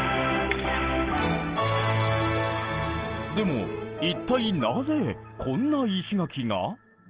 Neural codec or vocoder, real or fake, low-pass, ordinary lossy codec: none; real; 3.6 kHz; Opus, 16 kbps